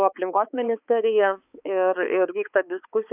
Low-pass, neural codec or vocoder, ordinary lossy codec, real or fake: 3.6 kHz; codec, 16 kHz, 4 kbps, X-Codec, HuBERT features, trained on balanced general audio; AAC, 32 kbps; fake